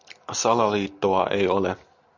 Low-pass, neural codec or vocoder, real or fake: 7.2 kHz; none; real